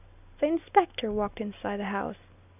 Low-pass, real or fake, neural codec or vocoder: 3.6 kHz; real; none